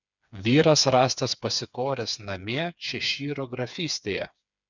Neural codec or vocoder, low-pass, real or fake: codec, 16 kHz, 4 kbps, FreqCodec, smaller model; 7.2 kHz; fake